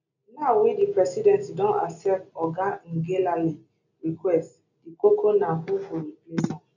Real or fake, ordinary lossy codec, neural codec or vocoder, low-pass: real; none; none; 7.2 kHz